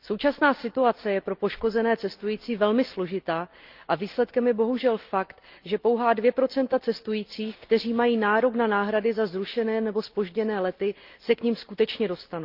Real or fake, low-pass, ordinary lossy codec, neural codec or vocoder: real; 5.4 kHz; Opus, 32 kbps; none